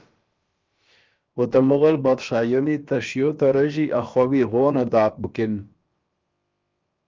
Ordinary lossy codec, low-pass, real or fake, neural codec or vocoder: Opus, 24 kbps; 7.2 kHz; fake; codec, 16 kHz, about 1 kbps, DyCAST, with the encoder's durations